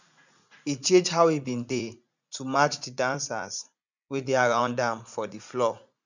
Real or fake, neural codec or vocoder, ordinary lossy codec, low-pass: fake; vocoder, 44.1 kHz, 80 mel bands, Vocos; none; 7.2 kHz